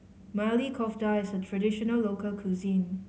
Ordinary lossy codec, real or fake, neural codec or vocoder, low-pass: none; real; none; none